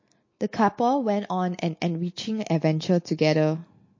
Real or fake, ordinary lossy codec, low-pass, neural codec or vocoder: real; MP3, 32 kbps; 7.2 kHz; none